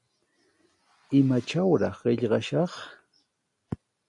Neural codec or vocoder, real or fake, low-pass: none; real; 10.8 kHz